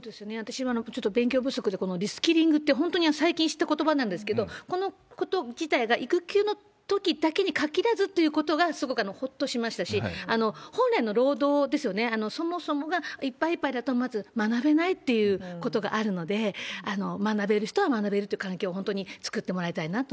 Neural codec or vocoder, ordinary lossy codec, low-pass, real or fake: none; none; none; real